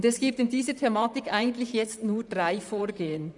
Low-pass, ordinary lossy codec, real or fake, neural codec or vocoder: 10.8 kHz; none; fake; vocoder, 44.1 kHz, 128 mel bands, Pupu-Vocoder